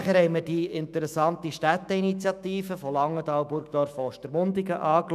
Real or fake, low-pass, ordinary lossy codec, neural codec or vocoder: fake; 14.4 kHz; none; autoencoder, 48 kHz, 128 numbers a frame, DAC-VAE, trained on Japanese speech